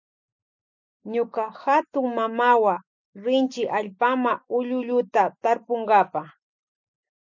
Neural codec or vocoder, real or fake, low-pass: none; real; 7.2 kHz